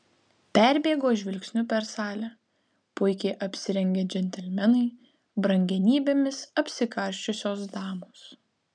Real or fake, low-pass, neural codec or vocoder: real; 9.9 kHz; none